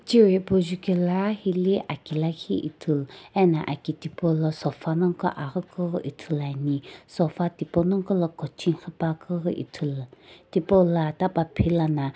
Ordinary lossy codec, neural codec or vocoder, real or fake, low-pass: none; none; real; none